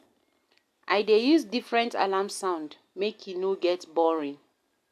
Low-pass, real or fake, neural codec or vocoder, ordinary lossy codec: 14.4 kHz; real; none; AAC, 96 kbps